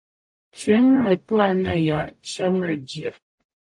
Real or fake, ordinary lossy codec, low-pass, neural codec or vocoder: fake; AAC, 64 kbps; 10.8 kHz; codec, 44.1 kHz, 0.9 kbps, DAC